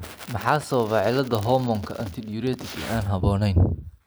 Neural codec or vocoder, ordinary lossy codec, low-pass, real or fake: none; none; none; real